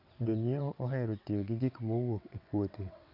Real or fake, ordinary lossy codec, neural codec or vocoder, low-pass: fake; none; vocoder, 22.05 kHz, 80 mel bands, WaveNeXt; 5.4 kHz